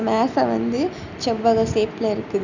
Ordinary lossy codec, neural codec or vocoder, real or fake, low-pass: none; none; real; 7.2 kHz